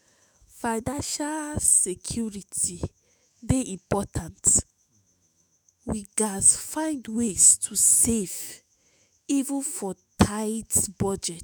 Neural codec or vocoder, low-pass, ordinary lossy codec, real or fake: autoencoder, 48 kHz, 128 numbers a frame, DAC-VAE, trained on Japanese speech; none; none; fake